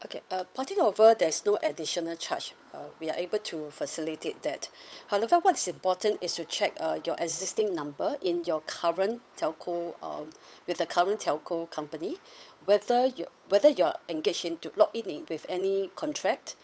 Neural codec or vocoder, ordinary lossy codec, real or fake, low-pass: codec, 16 kHz, 8 kbps, FunCodec, trained on Chinese and English, 25 frames a second; none; fake; none